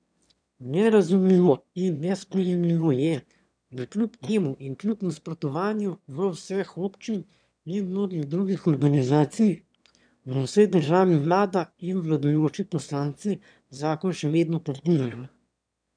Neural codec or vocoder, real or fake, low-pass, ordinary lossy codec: autoencoder, 22.05 kHz, a latent of 192 numbers a frame, VITS, trained on one speaker; fake; 9.9 kHz; none